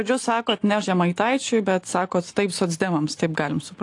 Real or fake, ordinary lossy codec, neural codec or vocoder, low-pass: real; AAC, 48 kbps; none; 10.8 kHz